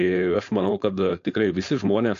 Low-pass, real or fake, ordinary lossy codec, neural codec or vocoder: 7.2 kHz; fake; AAC, 48 kbps; codec, 16 kHz, 4.8 kbps, FACodec